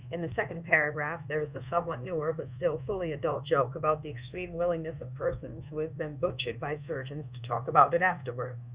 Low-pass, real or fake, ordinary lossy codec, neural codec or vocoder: 3.6 kHz; fake; Opus, 64 kbps; codec, 24 kHz, 1.2 kbps, DualCodec